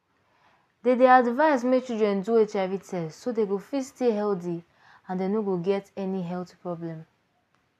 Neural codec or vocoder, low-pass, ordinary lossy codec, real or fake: none; 14.4 kHz; none; real